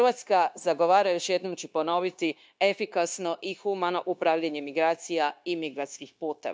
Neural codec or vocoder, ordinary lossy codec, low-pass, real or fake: codec, 16 kHz, 0.9 kbps, LongCat-Audio-Codec; none; none; fake